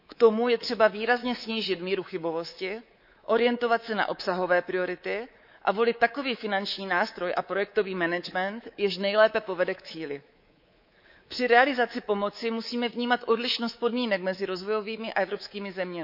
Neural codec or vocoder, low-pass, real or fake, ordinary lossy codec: codec, 24 kHz, 3.1 kbps, DualCodec; 5.4 kHz; fake; none